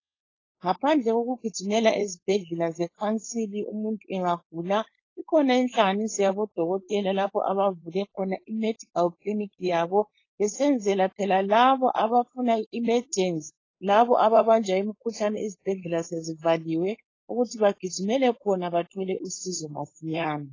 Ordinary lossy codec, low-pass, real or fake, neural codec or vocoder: AAC, 32 kbps; 7.2 kHz; fake; codec, 16 kHz, 4.8 kbps, FACodec